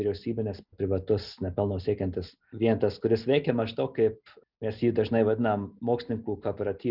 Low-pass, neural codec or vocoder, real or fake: 5.4 kHz; none; real